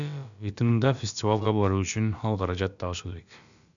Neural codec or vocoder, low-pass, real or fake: codec, 16 kHz, about 1 kbps, DyCAST, with the encoder's durations; 7.2 kHz; fake